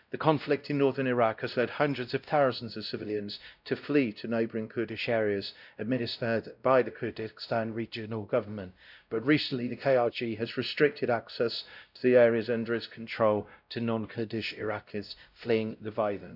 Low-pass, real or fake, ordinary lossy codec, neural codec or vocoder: 5.4 kHz; fake; none; codec, 16 kHz, 0.5 kbps, X-Codec, WavLM features, trained on Multilingual LibriSpeech